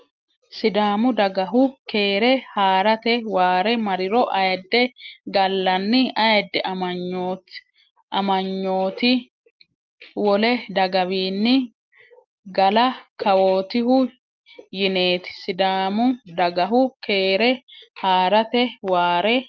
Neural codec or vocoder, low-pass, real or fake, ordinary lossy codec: none; 7.2 kHz; real; Opus, 32 kbps